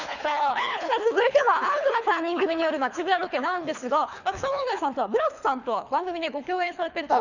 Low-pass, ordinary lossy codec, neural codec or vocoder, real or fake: 7.2 kHz; none; codec, 24 kHz, 3 kbps, HILCodec; fake